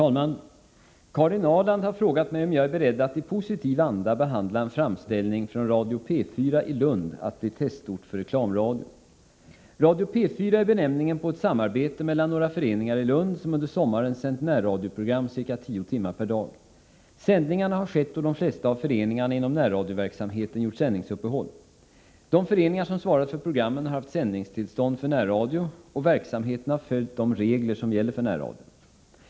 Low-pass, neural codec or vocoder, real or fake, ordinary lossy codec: none; none; real; none